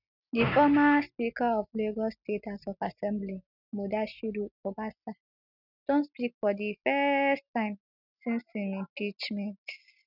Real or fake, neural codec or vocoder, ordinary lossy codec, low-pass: real; none; none; 5.4 kHz